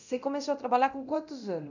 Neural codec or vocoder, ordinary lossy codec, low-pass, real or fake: codec, 24 kHz, 0.9 kbps, DualCodec; none; 7.2 kHz; fake